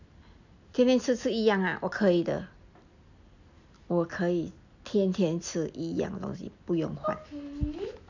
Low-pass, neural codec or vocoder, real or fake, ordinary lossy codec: 7.2 kHz; none; real; none